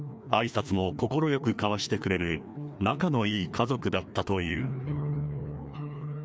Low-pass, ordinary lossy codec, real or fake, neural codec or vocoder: none; none; fake; codec, 16 kHz, 2 kbps, FreqCodec, larger model